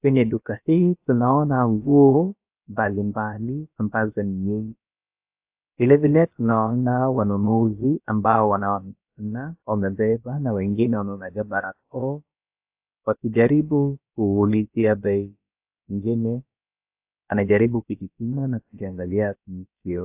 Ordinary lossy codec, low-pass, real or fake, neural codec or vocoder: AAC, 32 kbps; 3.6 kHz; fake; codec, 16 kHz, about 1 kbps, DyCAST, with the encoder's durations